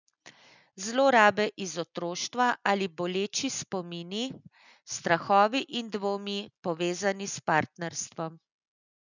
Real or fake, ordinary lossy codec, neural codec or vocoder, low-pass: real; none; none; 7.2 kHz